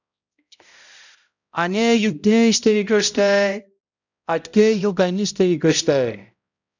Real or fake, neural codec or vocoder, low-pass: fake; codec, 16 kHz, 0.5 kbps, X-Codec, HuBERT features, trained on balanced general audio; 7.2 kHz